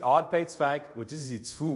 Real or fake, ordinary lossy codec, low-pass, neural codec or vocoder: fake; none; 10.8 kHz; codec, 24 kHz, 0.5 kbps, DualCodec